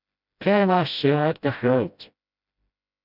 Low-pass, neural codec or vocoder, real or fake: 5.4 kHz; codec, 16 kHz, 0.5 kbps, FreqCodec, smaller model; fake